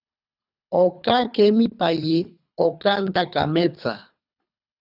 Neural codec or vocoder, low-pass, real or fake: codec, 24 kHz, 3 kbps, HILCodec; 5.4 kHz; fake